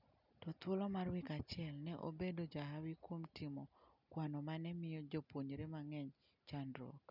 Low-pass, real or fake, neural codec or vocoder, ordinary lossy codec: 5.4 kHz; real; none; none